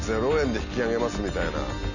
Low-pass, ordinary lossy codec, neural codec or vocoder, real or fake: 7.2 kHz; none; none; real